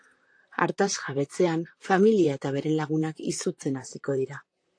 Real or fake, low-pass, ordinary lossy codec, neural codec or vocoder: fake; 9.9 kHz; AAC, 48 kbps; vocoder, 44.1 kHz, 128 mel bands, Pupu-Vocoder